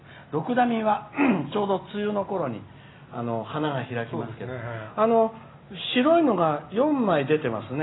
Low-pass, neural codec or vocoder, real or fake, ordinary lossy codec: 7.2 kHz; none; real; AAC, 16 kbps